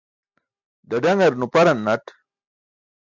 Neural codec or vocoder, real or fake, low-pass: none; real; 7.2 kHz